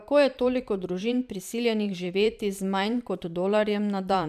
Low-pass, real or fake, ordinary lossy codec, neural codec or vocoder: 14.4 kHz; fake; none; vocoder, 44.1 kHz, 128 mel bands every 512 samples, BigVGAN v2